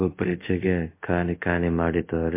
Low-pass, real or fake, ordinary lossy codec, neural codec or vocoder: 3.6 kHz; fake; MP3, 24 kbps; codec, 24 kHz, 0.5 kbps, DualCodec